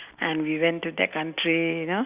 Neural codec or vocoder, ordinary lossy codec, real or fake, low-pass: none; Opus, 64 kbps; real; 3.6 kHz